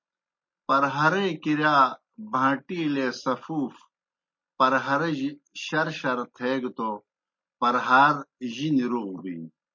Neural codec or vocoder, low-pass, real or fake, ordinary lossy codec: none; 7.2 kHz; real; MP3, 32 kbps